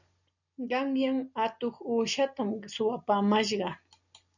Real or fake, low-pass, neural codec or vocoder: real; 7.2 kHz; none